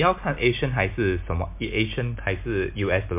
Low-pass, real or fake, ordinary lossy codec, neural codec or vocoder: 3.6 kHz; real; MP3, 32 kbps; none